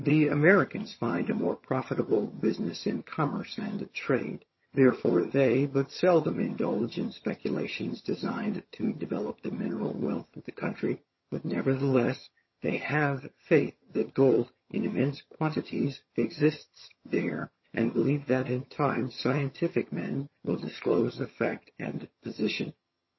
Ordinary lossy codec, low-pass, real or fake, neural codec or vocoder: MP3, 24 kbps; 7.2 kHz; fake; vocoder, 22.05 kHz, 80 mel bands, HiFi-GAN